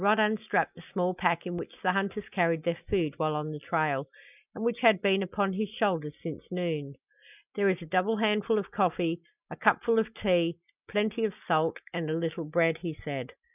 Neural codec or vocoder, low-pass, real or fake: none; 3.6 kHz; real